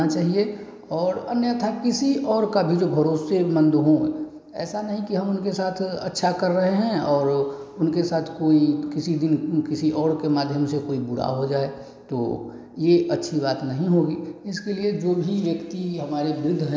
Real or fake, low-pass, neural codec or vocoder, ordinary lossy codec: real; none; none; none